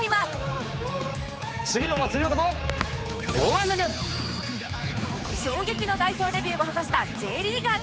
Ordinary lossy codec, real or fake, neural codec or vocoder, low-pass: none; fake; codec, 16 kHz, 4 kbps, X-Codec, HuBERT features, trained on general audio; none